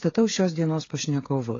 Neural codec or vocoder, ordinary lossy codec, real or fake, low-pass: codec, 16 kHz, 8 kbps, FreqCodec, smaller model; AAC, 32 kbps; fake; 7.2 kHz